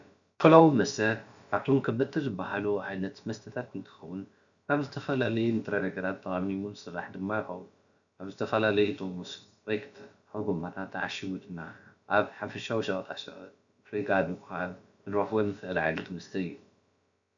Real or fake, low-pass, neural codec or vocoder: fake; 7.2 kHz; codec, 16 kHz, about 1 kbps, DyCAST, with the encoder's durations